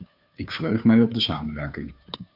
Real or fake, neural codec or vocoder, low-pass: fake; codec, 16 kHz, 2 kbps, FunCodec, trained on Chinese and English, 25 frames a second; 5.4 kHz